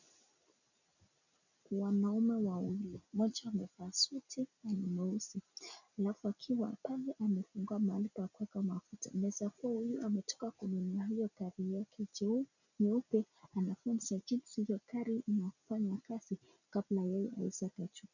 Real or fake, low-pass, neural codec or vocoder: real; 7.2 kHz; none